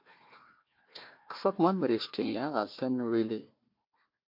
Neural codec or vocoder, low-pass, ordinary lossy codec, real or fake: codec, 16 kHz, 1 kbps, FunCodec, trained on Chinese and English, 50 frames a second; 5.4 kHz; MP3, 32 kbps; fake